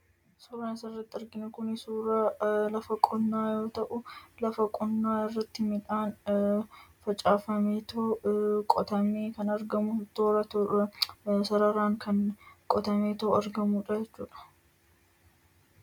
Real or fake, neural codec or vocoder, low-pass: real; none; 19.8 kHz